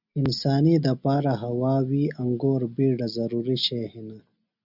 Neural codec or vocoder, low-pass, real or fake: none; 5.4 kHz; real